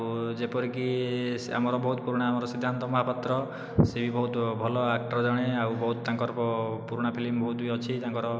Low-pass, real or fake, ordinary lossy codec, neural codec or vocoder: none; real; none; none